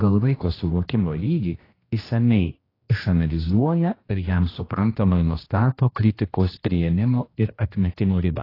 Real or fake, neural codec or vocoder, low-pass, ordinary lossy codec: fake; codec, 16 kHz, 1 kbps, X-Codec, HuBERT features, trained on general audio; 5.4 kHz; AAC, 24 kbps